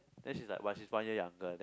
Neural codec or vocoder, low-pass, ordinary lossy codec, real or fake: none; none; none; real